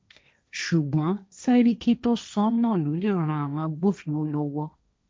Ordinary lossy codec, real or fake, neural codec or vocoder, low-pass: none; fake; codec, 16 kHz, 1.1 kbps, Voila-Tokenizer; none